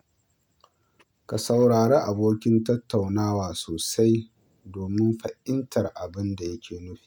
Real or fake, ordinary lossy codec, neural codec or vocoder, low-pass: real; none; none; 19.8 kHz